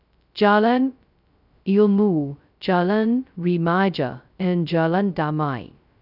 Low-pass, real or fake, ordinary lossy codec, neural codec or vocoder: 5.4 kHz; fake; none; codec, 16 kHz, 0.2 kbps, FocalCodec